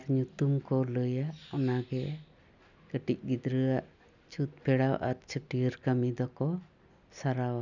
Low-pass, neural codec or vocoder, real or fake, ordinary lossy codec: 7.2 kHz; none; real; none